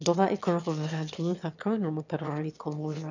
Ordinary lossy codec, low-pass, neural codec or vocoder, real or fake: none; 7.2 kHz; autoencoder, 22.05 kHz, a latent of 192 numbers a frame, VITS, trained on one speaker; fake